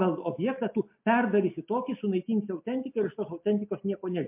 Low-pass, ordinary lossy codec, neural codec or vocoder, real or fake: 3.6 kHz; MP3, 32 kbps; none; real